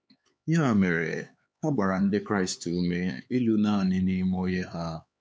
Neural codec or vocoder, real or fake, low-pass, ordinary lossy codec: codec, 16 kHz, 4 kbps, X-Codec, HuBERT features, trained on LibriSpeech; fake; none; none